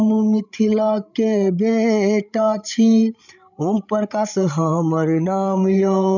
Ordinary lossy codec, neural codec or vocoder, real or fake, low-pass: none; codec, 16 kHz, 8 kbps, FreqCodec, larger model; fake; 7.2 kHz